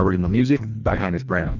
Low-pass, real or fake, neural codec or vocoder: 7.2 kHz; fake; codec, 24 kHz, 1.5 kbps, HILCodec